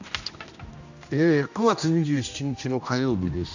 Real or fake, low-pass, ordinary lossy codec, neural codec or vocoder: fake; 7.2 kHz; none; codec, 16 kHz, 1 kbps, X-Codec, HuBERT features, trained on general audio